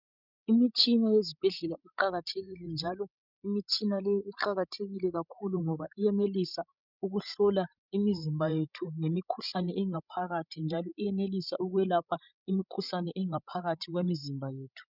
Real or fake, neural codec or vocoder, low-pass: fake; codec, 16 kHz, 16 kbps, FreqCodec, larger model; 5.4 kHz